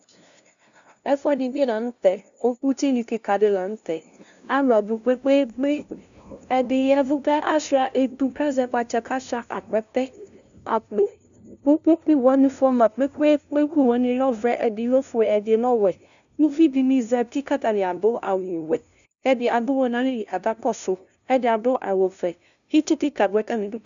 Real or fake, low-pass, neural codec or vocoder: fake; 7.2 kHz; codec, 16 kHz, 0.5 kbps, FunCodec, trained on LibriTTS, 25 frames a second